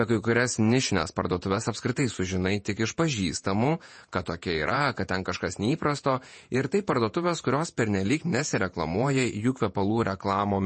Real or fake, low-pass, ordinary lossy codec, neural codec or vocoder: fake; 10.8 kHz; MP3, 32 kbps; vocoder, 48 kHz, 128 mel bands, Vocos